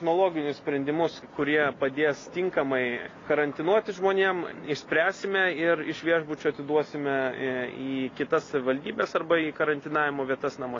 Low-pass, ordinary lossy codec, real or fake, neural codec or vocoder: 7.2 kHz; AAC, 32 kbps; real; none